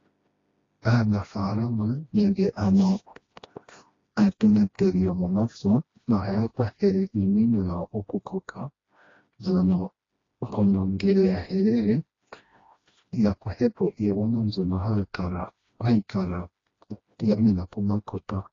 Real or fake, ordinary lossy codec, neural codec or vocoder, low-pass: fake; AAC, 32 kbps; codec, 16 kHz, 1 kbps, FreqCodec, smaller model; 7.2 kHz